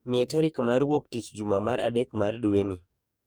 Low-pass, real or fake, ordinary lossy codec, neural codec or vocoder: none; fake; none; codec, 44.1 kHz, 2.6 kbps, DAC